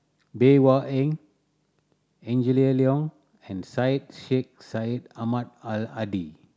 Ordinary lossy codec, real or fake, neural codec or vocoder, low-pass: none; real; none; none